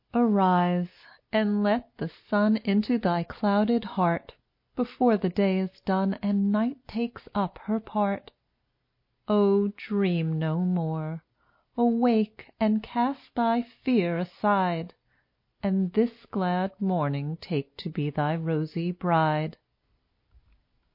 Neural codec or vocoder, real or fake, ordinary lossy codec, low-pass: none; real; MP3, 32 kbps; 5.4 kHz